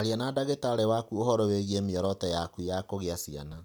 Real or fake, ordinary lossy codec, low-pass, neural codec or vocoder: real; none; none; none